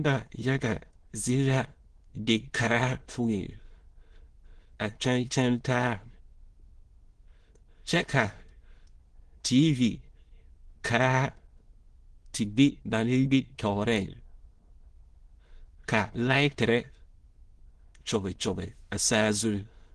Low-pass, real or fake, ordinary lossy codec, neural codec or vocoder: 9.9 kHz; fake; Opus, 16 kbps; autoencoder, 22.05 kHz, a latent of 192 numbers a frame, VITS, trained on many speakers